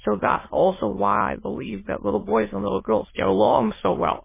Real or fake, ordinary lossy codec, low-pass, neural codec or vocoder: fake; MP3, 16 kbps; 3.6 kHz; autoencoder, 22.05 kHz, a latent of 192 numbers a frame, VITS, trained on many speakers